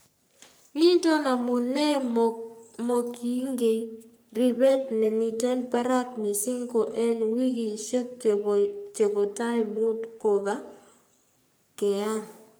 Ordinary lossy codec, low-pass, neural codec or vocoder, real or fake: none; none; codec, 44.1 kHz, 3.4 kbps, Pupu-Codec; fake